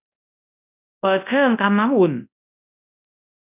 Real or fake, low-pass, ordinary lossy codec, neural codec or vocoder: fake; 3.6 kHz; none; codec, 24 kHz, 0.9 kbps, WavTokenizer, large speech release